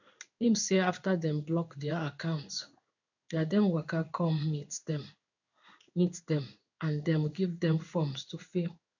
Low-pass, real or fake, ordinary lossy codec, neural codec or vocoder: 7.2 kHz; fake; none; codec, 16 kHz in and 24 kHz out, 1 kbps, XY-Tokenizer